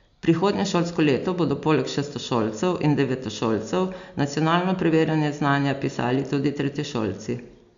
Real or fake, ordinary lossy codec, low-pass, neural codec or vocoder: real; Opus, 64 kbps; 7.2 kHz; none